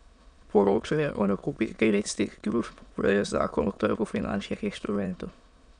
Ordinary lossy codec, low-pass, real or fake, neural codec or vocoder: none; 9.9 kHz; fake; autoencoder, 22.05 kHz, a latent of 192 numbers a frame, VITS, trained on many speakers